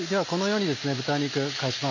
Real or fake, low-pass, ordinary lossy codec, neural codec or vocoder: real; 7.2 kHz; none; none